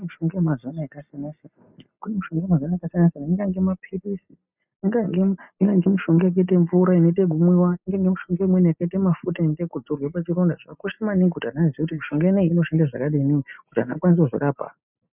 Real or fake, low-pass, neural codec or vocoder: real; 3.6 kHz; none